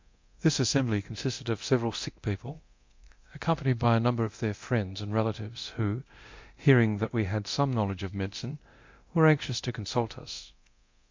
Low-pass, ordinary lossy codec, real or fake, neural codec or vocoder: 7.2 kHz; MP3, 48 kbps; fake; codec, 24 kHz, 0.9 kbps, DualCodec